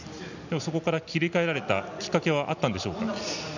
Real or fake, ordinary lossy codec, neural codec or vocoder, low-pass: real; none; none; 7.2 kHz